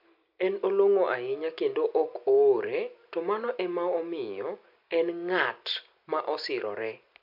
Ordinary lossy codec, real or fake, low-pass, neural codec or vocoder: MP3, 48 kbps; real; 5.4 kHz; none